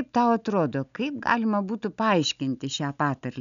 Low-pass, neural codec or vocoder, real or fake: 7.2 kHz; none; real